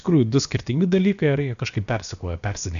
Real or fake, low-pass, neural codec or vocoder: fake; 7.2 kHz; codec, 16 kHz, about 1 kbps, DyCAST, with the encoder's durations